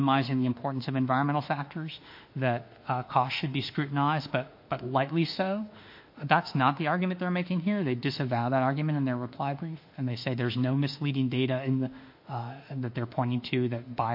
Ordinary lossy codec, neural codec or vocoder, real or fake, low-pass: MP3, 32 kbps; autoencoder, 48 kHz, 32 numbers a frame, DAC-VAE, trained on Japanese speech; fake; 5.4 kHz